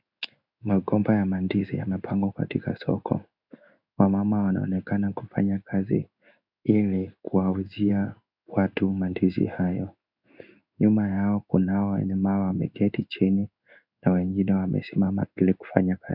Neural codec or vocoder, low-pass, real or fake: codec, 16 kHz in and 24 kHz out, 1 kbps, XY-Tokenizer; 5.4 kHz; fake